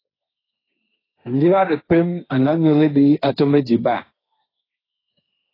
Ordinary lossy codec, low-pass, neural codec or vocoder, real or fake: AAC, 24 kbps; 5.4 kHz; codec, 16 kHz, 1.1 kbps, Voila-Tokenizer; fake